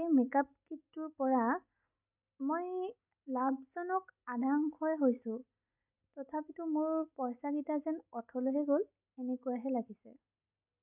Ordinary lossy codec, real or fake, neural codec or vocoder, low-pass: none; real; none; 3.6 kHz